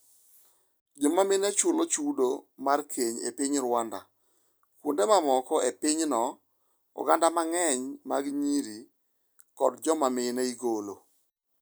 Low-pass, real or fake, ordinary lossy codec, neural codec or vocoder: none; real; none; none